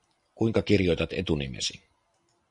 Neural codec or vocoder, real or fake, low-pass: none; real; 10.8 kHz